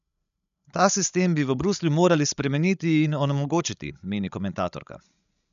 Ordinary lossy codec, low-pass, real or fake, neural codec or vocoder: none; 7.2 kHz; fake; codec, 16 kHz, 16 kbps, FreqCodec, larger model